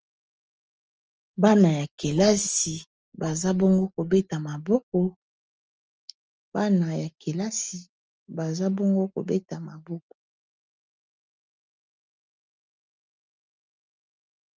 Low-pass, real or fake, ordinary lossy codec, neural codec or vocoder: 7.2 kHz; real; Opus, 24 kbps; none